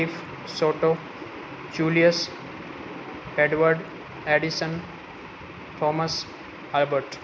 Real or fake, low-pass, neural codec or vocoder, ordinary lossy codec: real; none; none; none